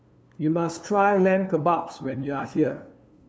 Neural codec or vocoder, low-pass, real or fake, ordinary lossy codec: codec, 16 kHz, 2 kbps, FunCodec, trained on LibriTTS, 25 frames a second; none; fake; none